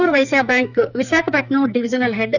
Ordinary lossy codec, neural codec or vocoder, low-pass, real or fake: none; codec, 44.1 kHz, 2.6 kbps, SNAC; 7.2 kHz; fake